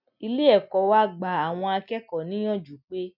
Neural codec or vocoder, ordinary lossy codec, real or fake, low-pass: none; none; real; 5.4 kHz